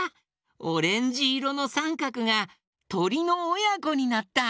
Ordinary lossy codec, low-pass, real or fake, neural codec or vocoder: none; none; real; none